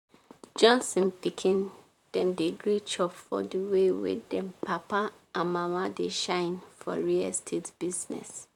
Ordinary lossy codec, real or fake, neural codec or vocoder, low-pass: none; fake; vocoder, 44.1 kHz, 128 mel bands, Pupu-Vocoder; 19.8 kHz